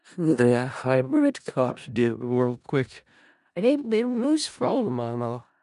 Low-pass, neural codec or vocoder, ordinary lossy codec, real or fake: 10.8 kHz; codec, 16 kHz in and 24 kHz out, 0.4 kbps, LongCat-Audio-Codec, four codebook decoder; none; fake